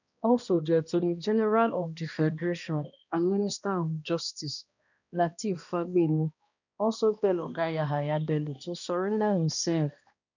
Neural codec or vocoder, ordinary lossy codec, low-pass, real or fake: codec, 16 kHz, 1 kbps, X-Codec, HuBERT features, trained on balanced general audio; none; 7.2 kHz; fake